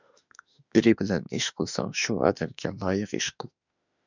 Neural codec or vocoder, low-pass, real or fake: autoencoder, 48 kHz, 32 numbers a frame, DAC-VAE, trained on Japanese speech; 7.2 kHz; fake